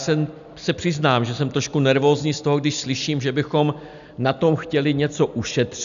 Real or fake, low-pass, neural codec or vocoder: real; 7.2 kHz; none